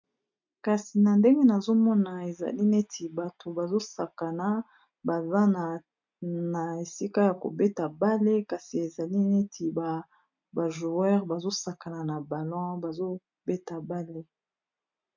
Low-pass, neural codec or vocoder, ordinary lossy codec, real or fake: 7.2 kHz; none; MP3, 64 kbps; real